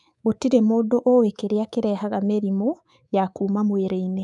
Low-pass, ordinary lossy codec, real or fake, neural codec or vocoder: 10.8 kHz; none; fake; codec, 24 kHz, 3.1 kbps, DualCodec